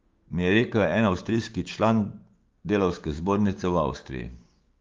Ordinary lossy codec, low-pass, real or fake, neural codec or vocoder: Opus, 32 kbps; 7.2 kHz; fake; codec, 16 kHz, 6 kbps, DAC